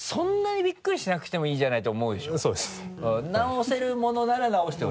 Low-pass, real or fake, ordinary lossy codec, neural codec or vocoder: none; real; none; none